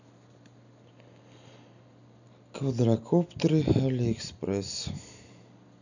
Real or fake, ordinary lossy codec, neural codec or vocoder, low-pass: real; none; none; 7.2 kHz